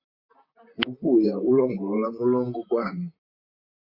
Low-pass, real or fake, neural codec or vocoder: 5.4 kHz; fake; vocoder, 44.1 kHz, 128 mel bands, Pupu-Vocoder